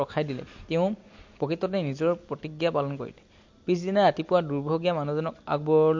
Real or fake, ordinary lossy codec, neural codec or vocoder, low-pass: real; MP3, 48 kbps; none; 7.2 kHz